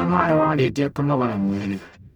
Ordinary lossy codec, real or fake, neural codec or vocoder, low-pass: none; fake; codec, 44.1 kHz, 0.9 kbps, DAC; 19.8 kHz